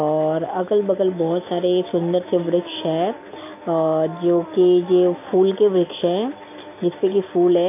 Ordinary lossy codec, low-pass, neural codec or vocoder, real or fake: MP3, 24 kbps; 3.6 kHz; none; real